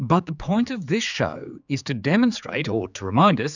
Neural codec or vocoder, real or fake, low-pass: codec, 16 kHz, 4 kbps, X-Codec, HuBERT features, trained on general audio; fake; 7.2 kHz